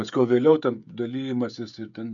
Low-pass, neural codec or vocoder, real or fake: 7.2 kHz; codec, 16 kHz, 16 kbps, FreqCodec, smaller model; fake